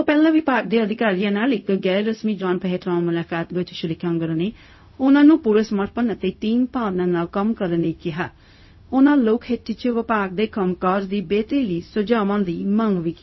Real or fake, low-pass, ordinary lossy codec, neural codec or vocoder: fake; 7.2 kHz; MP3, 24 kbps; codec, 16 kHz, 0.4 kbps, LongCat-Audio-Codec